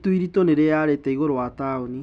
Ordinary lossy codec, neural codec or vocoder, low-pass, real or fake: none; none; none; real